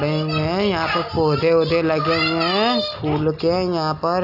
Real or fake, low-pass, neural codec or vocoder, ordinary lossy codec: real; 5.4 kHz; none; none